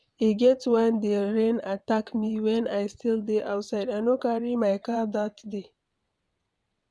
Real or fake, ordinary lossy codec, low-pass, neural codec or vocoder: fake; none; none; vocoder, 22.05 kHz, 80 mel bands, WaveNeXt